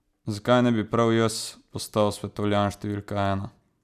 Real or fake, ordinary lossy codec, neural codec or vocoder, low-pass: real; none; none; 14.4 kHz